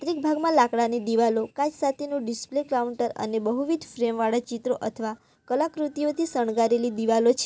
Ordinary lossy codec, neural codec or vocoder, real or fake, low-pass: none; none; real; none